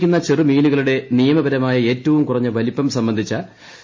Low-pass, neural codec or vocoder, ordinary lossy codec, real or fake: 7.2 kHz; none; MP3, 32 kbps; real